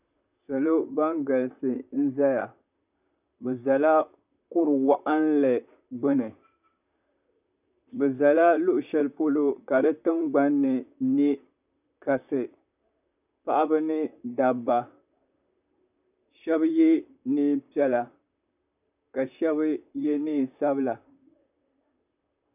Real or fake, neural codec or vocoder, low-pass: fake; vocoder, 44.1 kHz, 128 mel bands, Pupu-Vocoder; 3.6 kHz